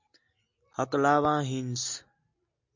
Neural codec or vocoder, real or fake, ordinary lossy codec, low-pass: none; real; MP3, 64 kbps; 7.2 kHz